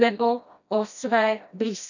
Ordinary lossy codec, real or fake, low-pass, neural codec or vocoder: none; fake; 7.2 kHz; codec, 16 kHz, 1 kbps, FreqCodec, smaller model